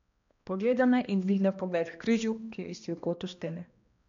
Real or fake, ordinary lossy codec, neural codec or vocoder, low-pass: fake; MP3, 64 kbps; codec, 16 kHz, 1 kbps, X-Codec, HuBERT features, trained on balanced general audio; 7.2 kHz